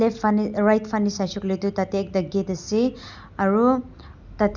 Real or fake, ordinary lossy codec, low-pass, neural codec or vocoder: real; none; 7.2 kHz; none